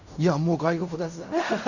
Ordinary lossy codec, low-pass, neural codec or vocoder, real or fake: none; 7.2 kHz; codec, 16 kHz in and 24 kHz out, 0.4 kbps, LongCat-Audio-Codec, fine tuned four codebook decoder; fake